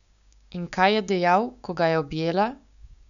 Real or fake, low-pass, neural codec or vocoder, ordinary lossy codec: fake; 7.2 kHz; codec, 16 kHz, 6 kbps, DAC; none